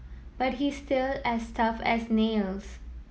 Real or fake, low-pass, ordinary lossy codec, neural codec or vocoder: real; none; none; none